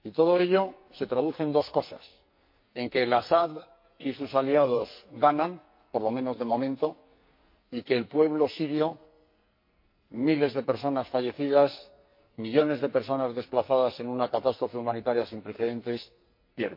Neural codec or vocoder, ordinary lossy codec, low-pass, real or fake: codec, 44.1 kHz, 2.6 kbps, SNAC; MP3, 32 kbps; 5.4 kHz; fake